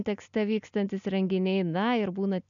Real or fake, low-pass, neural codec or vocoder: real; 7.2 kHz; none